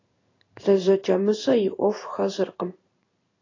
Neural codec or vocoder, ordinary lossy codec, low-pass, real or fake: codec, 16 kHz in and 24 kHz out, 1 kbps, XY-Tokenizer; AAC, 32 kbps; 7.2 kHz; fake